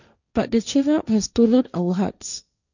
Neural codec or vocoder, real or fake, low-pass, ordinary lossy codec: codec, 16 kHz, 1.1 kbps, Voila-Tokenizer; fake; 7.2 kHz; none